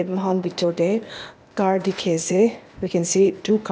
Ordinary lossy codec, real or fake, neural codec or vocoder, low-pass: none; fake; codec, 16 kHz, 0.8 kbps, ZipCodec; none